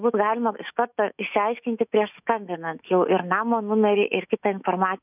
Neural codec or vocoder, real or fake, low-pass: autoencoder, 48 kHz, 128 numbers a frame, DAC-VAE, trained on Japanese speech; fake; 3.6 kHz